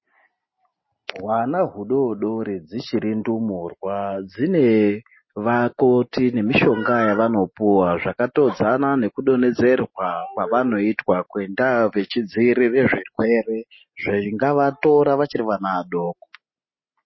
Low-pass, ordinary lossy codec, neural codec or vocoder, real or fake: 7.2 kHz; MP3, 24 kbps; none; real